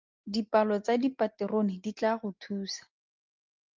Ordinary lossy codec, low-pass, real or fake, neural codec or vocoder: Opus, 24 kbps; 7.2 kHz; real; none